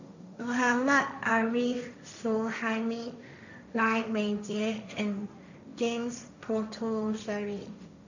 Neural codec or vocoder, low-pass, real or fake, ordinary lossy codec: codec, 16 kHz, 1.1 kbps, Voila-Tokenizer; 7.2 kHz; fake; none